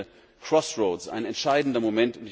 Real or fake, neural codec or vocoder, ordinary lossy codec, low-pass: real; none; none; none